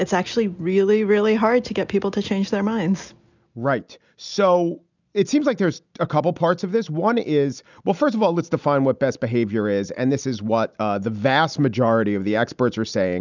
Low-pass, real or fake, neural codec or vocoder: 7.2 kHz; real; none